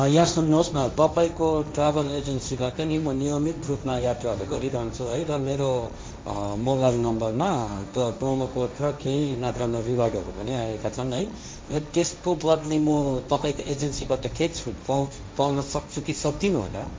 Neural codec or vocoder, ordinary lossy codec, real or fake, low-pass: codec, 16 kHz, 1.1 kbps, Voila-Tokenizer; none; fake; none